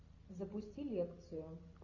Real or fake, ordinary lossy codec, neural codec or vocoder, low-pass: real; AAC, 32 kbps; none; 7.2 kHz